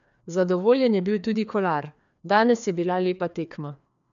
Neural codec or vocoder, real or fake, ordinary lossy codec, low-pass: codec, 16 kHz, 2 kbps, FreqCodec, larger model; fake; none; 7.2 kHz